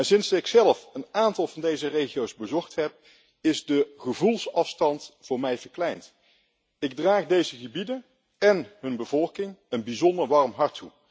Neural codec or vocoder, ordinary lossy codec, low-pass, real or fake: none; none; none; real